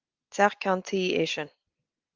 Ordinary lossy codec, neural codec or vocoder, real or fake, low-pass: Opus, 24 kbps; none; real; 7.2 kHz